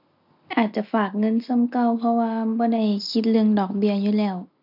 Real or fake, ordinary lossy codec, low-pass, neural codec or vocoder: real; none; 5.4 kHz; none